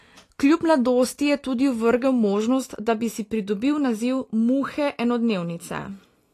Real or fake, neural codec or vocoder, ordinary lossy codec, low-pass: real; none; AAC, 48 kbps; 14.4 kHz